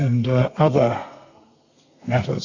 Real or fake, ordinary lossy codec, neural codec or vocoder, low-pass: fake; Opus, 64 kbps; codec, 32 kHz, 1.9 kbps, SNAC; 7.2 kHz